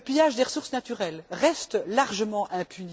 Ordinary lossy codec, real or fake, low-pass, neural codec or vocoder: none; real; none; none